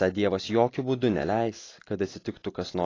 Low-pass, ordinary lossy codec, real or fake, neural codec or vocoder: 7.2 kHz; AAC, 32 kbps; real; none